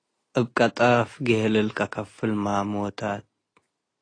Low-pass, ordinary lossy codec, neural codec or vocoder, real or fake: 9.9 kHz; AAC, 32 kbps; none; real